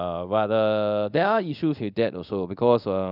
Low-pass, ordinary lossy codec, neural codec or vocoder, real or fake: 5.4 kHz; none; codec, 24 kHz, 0.5 kbps, DualCodec; fake